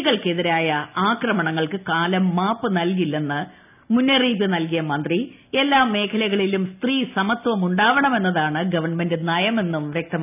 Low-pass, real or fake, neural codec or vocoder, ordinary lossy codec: 3.6 kHz; real; none; none